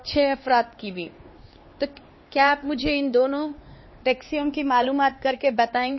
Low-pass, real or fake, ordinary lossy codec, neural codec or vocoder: 7.2 kHz; fake; MP3, 24 kbps; codec, 16 kHz, 4 kbps, X-Codec, HuBERT features, trained on LibriSpeech